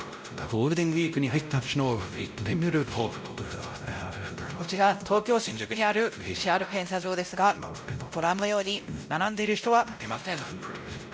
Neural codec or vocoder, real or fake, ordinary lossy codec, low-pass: codec, 16 kHz, 0.5 kbps, X-Codec, WavLM features, trained on Multilingual LibriSpeech; fake; none; none